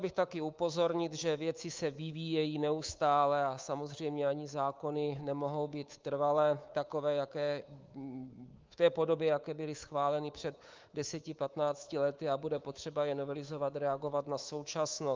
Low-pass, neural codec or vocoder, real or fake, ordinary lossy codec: 7.2 kHz; codec, 24 kHz, 3.1 kbps, DualCodec; fake; Opus, 32 kbps